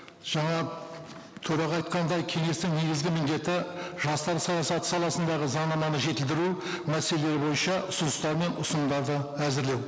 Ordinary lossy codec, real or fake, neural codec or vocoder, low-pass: none; real; none; none